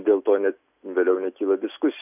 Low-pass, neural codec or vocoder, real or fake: 3.6 kHz; none; real